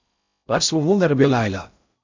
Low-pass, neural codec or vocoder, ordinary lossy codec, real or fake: 7.2 kHz; codec, 16 kHz in and 24 kHz out, 0.6 kbps, FocalCodec, streaming, 4096 codes; MP3, 64 kbps; fake